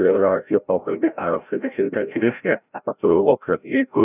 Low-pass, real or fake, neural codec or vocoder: 3.6 kHz; fake; codec, 16 kHz, 0.5 kbps, FreqCodec, larger model